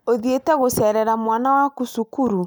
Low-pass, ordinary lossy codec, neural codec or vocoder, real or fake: none; none; none; real